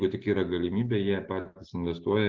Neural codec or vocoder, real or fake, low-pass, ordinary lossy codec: none; real; 7.2 kHz; Opus, 16 kbps